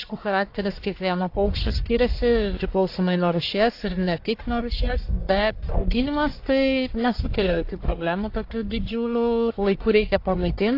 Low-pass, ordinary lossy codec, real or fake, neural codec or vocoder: 5.4 kHz; AAC, 32 kbps; fake; codec, 44.1 kHz, 1.7 kbps, Pupu-Codec